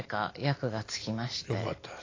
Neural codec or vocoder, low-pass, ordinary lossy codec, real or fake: none; 7.2 kHz; AAC, 32 kbps; real